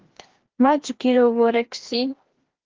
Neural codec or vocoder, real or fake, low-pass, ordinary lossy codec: codec, 16 kHz, 1 kbps, FreqCodec, larger model; fake; 7.2 kHz; Opus, 16 kbps